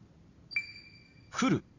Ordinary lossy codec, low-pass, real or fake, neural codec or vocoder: AAC, 32 kbps; 7.2 kHz; real; none